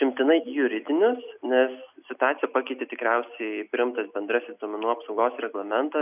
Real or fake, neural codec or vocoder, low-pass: real; none; 3.6 kHz